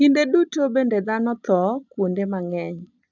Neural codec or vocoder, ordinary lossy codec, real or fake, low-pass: none; none; real; 7.2 kHz